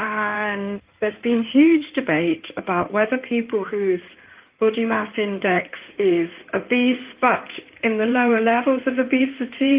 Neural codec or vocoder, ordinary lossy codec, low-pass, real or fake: codec, 16 kHz in and 24 kHz out, 2.2 kbps, FireRedTTS-2 codec; Opus, 32 kbps; 3.6 kHz; fake